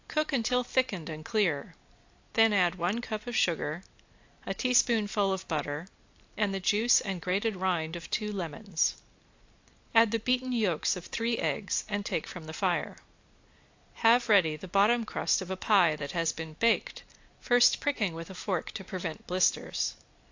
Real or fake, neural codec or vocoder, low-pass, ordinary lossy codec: real; none; 7.2 kHz; AAC, 48 kbps